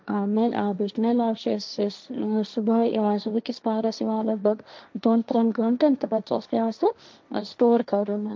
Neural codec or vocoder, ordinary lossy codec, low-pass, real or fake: codec, 16 kHz, 1.1 kbps, Voila-Tokenizer; none; none; fake